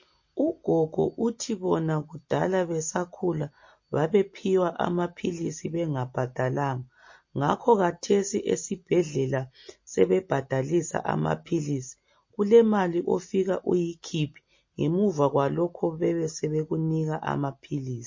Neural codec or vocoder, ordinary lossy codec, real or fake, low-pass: none; MP3, 32 kbps; real; 7.2 kHz